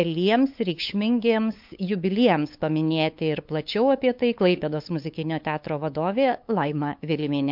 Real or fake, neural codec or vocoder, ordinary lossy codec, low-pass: fake; codec, 16 kHz, 8 kbps, FunCodec, trained on LibriTTS, 25 frames a second; MP3, 48 kbps; 5.4 kHz